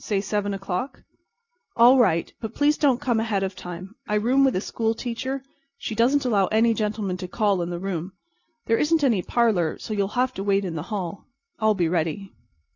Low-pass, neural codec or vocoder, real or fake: 7.2 kHz; none; real